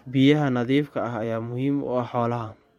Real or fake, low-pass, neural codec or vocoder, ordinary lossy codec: fake; 19.8 kHz; vocoder, 44.1 kHz, 128 mel bands every 512 samples, BigVGAN v2; MP3, 64 kbps